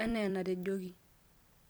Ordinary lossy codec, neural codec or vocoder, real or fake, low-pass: none; vocoder, 44.1 kHz, 128 mel bands every 256 samples, BigVGAN v2; fake; none